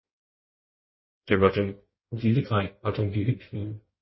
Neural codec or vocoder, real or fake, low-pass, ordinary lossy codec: codec, 16 kHz in and 24 kHz out, 1.1 kbps, FireRedTTS-2 codec; fake; 7.2 kHz; MP3, 24 kbps